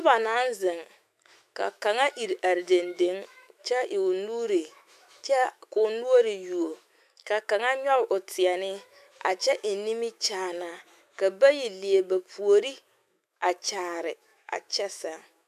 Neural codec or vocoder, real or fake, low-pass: autoencoder, 48 kHz, 128 numbers a frame, DAC-VAE, trained on Japanese speech; fake; 14.4 kHz